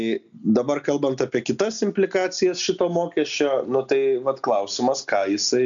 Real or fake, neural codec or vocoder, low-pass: real; none; 7.2 kHz